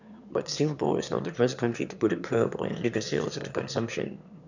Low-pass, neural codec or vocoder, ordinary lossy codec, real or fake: 7.2 kHz; autoencoder, 22.05 kHz, a latent of 192 numbers a frame, VITS, trained on one speaker; none; fake